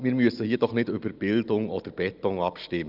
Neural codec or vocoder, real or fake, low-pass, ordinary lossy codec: none; real; 5.4 kHz; Opus, 24 kbps